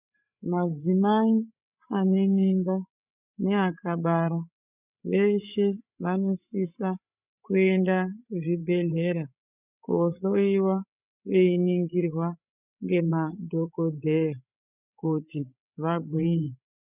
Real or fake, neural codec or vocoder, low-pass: fake; codec, 16 kHz, 8 kbps, FreqCodec, larger model; 3.6 kHz